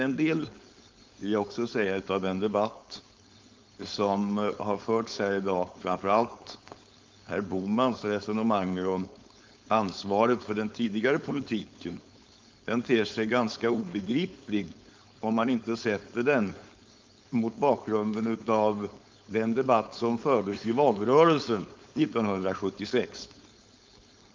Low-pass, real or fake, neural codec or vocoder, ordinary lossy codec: 7.2 kHz; fake; codec, 16 kHz, 4.8 kbps, FACodec; Opus, 24 kbps